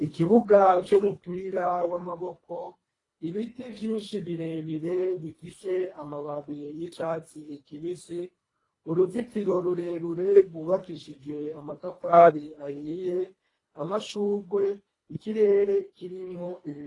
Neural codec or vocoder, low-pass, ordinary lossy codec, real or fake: codec, 24 kHz, 1.5 kbps, HILCodec; 10.8 kHz; AAC, 32 kbps; fake